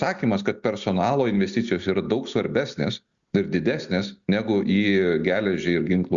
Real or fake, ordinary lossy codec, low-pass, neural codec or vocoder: real; Opus, 64 kbps; 7.2 kHz; none